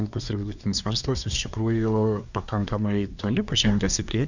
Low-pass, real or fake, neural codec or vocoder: 7.2 kHz; fake; codec, 24 kHz, 1 kbps, SNAC